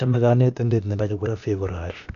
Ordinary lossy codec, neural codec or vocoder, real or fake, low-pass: none; codec, 16 kHz, 0.8 kbps, ZipCodec; fake; 7.2 kHz